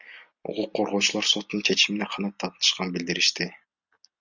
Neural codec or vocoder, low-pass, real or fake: none; 7.2 kHz; real